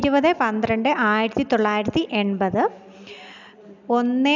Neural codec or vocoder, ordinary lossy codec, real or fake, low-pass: none; none; real; 7.2 kHz